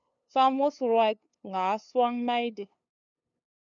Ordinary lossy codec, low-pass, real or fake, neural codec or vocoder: MP3, 96 kbps; 7.2 kHz; fake; codec, 16 kHz, 2 kbps, FunCodec, trained on LibriTTS, 25 frames a second